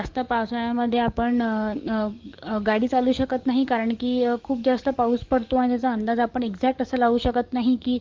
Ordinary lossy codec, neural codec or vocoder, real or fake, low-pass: Opus, 32 kbps; codec, 44.1 kHz, 7.8 kbps, DAC; fake; 7.2 kHz